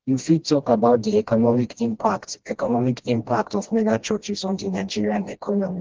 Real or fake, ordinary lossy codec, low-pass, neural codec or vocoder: fake; Opus, 16 kbps; 7.2 kHz; codec, 16 kHz, 1 kbps, FreqCodec, smaller model